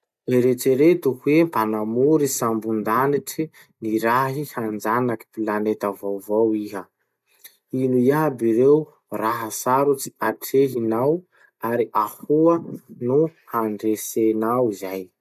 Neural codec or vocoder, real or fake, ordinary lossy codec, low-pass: none; real; none; 14.4 kHz